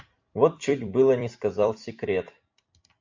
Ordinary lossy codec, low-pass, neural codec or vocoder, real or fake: MP3, 48 kbps; 7.2 kHz; vocoder, 44.1 kHz, 128 mel bands every 256 samples, BigVGAN v2; fake